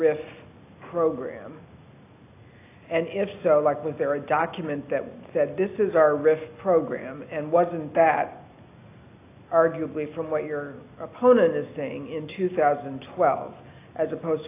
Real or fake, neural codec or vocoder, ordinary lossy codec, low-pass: real; none; AAC, 24 kbps; 3.6 kHz